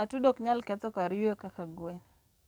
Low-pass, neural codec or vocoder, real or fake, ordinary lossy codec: none; codec, 44.1 kHz, 7.8 kbps, DAC; fake; none